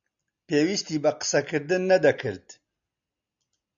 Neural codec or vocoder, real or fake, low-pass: none; real; 7.2 kHz